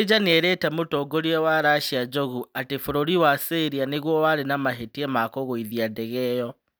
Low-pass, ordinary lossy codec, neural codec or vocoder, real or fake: none; none; none; real